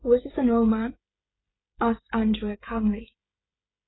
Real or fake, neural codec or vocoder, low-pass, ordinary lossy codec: fake; codec, 16 kHz, 16 kbps, FreqCodec, smaller model; 7.2 kHz; AAC, 16 kbps